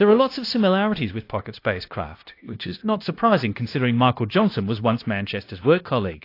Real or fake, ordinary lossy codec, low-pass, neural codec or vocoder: fake; AAC, 32 kbps; 5.4 kHz; codec, 16 kHz, 0.9 kbps, LongCat-Audio-Codec